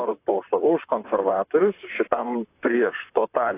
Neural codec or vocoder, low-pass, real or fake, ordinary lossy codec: vocoder, 44.1 kHz, 128 mel bands, Pupu-Vocoder; 3.6 kHz; fake; AAC, 24 kbps